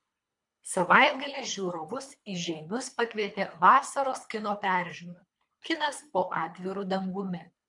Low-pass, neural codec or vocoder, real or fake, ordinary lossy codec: 10.8 kHz; codec, 24 kHz, 3 kbps, HILCodec; fake; MP3, 64 kbps